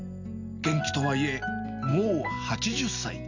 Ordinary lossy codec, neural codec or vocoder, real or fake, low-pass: none; none; real; 7.2 kHz